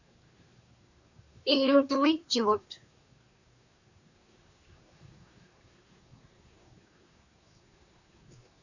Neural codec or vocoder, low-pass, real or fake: codec, 24 kHz, 1 kbps, SNAC; 7.2 kHz; fake